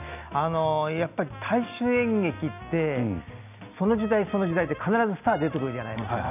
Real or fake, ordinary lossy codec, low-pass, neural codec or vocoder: real; none; 3.6 kHz; none